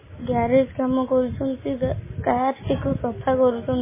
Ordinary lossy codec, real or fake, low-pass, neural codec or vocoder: MP3, 16 kbps; real; 3.6 kHz; none